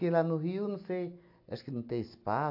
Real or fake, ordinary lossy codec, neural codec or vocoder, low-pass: real; AAC, 48 kbps; none; 5.4 kHz